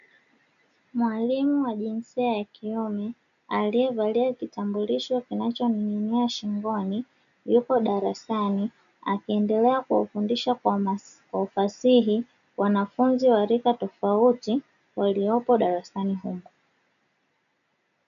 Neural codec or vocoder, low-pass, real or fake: none; 7.2 kHz; real